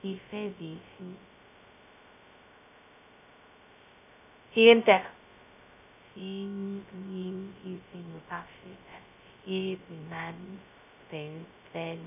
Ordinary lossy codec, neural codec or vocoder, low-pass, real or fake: none; codec, 16 kHz, 0.2 kbps, FocalCodec; 3.6 kHz; fake